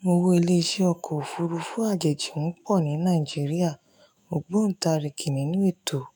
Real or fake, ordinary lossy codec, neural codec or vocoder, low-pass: fake; none; autoencoder, 48 kHz, 128 numbers a frame, DAC-VAE, trained on Japanese speech; none